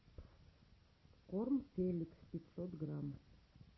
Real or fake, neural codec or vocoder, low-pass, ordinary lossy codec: real; none; 7.2 kHz; MP3, 24 kbps